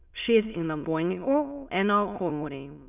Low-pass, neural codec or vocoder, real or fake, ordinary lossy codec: 3.6 kHz; autoencoder, 22.05 kHz, a latent of 192 numbers a frame, VITS, trained on many speakers; fake; none